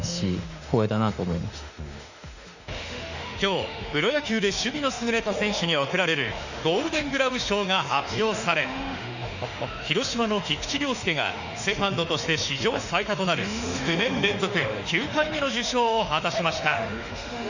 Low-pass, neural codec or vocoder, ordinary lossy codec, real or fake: 7.2 kHz; autoencoder, 48 kHz, 32 numbers a frame, DAC-VAE, trained on Japanese speech; none; fake